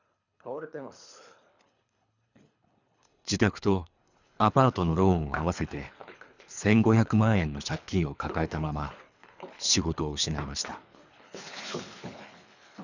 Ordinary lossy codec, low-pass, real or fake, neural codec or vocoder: none; 7.2 kHz; fake; codec, 24 kHz, 3 kbps, HILCodec